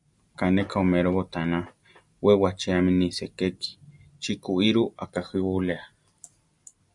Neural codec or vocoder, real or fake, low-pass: none; real; 10.8 kHz